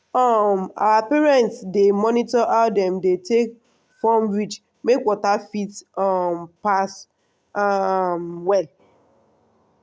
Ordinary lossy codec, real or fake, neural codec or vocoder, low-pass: none; real; none; none